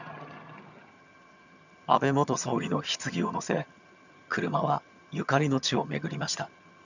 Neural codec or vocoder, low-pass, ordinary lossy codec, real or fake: vocoder, 22.05 kHz, 80 mel bands, HiFi-GAN; 7.2 kHz; none; fake